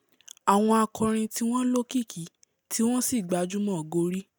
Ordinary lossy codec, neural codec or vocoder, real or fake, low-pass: none; none; real; none